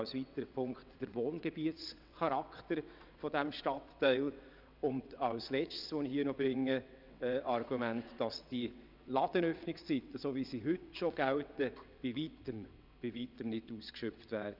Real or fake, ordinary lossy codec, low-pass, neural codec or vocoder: fake; none; 5.4 kHz; vocoder, 22.05 kHz, 80 mel bands, WaveNeXt